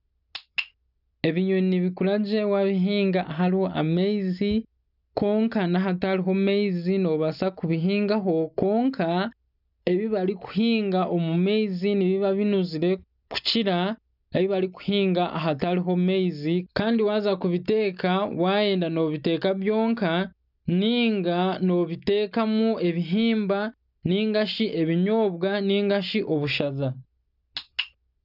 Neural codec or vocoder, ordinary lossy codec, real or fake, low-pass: none; none; real; 5.4 kHz